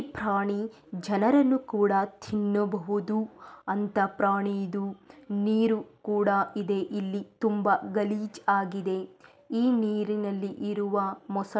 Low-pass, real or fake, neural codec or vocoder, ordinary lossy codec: none; real; none; none